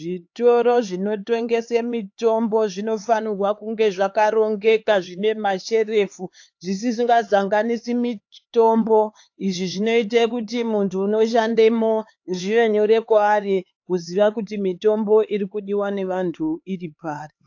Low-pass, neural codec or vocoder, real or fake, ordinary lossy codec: 7.2 kHz; codec, 16 kHz, 4 kbps, X-Codec, HuBERT features, trained on LibriSpeech; fake; AAC, 48 kbps